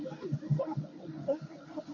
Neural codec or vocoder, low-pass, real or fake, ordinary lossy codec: codec, 16 kHz in and 24 kHz out, 1 kbps, XY-Tokenizer; 7.2 kHz; fake; MP3, 64 kbps